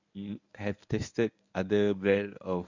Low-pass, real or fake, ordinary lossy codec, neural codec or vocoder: 7.2 kHz; fake; none; codec, 24 kHz, 0.9 kbps, WavTokenizer, medium speech release version 1